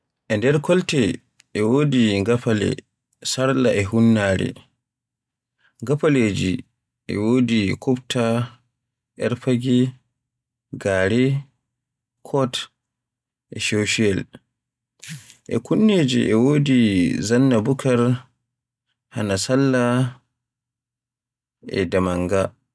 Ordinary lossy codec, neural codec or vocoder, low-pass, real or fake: none; none; none; real